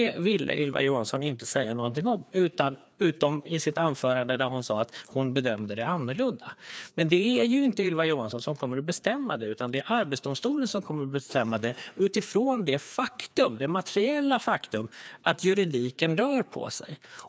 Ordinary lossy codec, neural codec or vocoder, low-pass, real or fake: none; codec, 16 kHz, 2 kbps, FreqCodec, larger model; none; fake